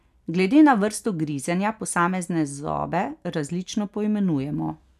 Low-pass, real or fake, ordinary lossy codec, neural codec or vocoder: 14.4 kHz; real; none; none